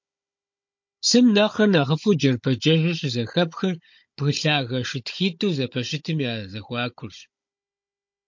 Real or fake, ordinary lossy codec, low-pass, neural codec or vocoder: fake; MP3, 48 kbps; 7.2 kHz; codec, 16 kHz, 16 kbps, FunCodec, trained on Chinese and English, 50 frames a second